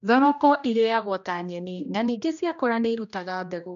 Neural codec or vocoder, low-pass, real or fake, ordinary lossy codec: codec, 16 kHz, 1 kbps, X-Codec, HuBERT features, trained on general audio; 7.2 kHz; fake; none